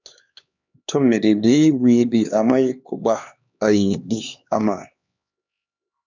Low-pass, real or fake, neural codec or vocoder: 7.2 kHz; fake; codec, 16 kHz, 2 kbps, X-Codec, HuBERT features, trained on LibriSpeech